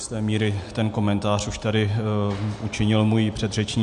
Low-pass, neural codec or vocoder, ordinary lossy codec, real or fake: 10.8 kHz; none; MP3, 64 kbps; real